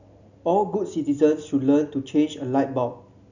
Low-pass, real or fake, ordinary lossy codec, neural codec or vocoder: 7.2 kHz; real; none; none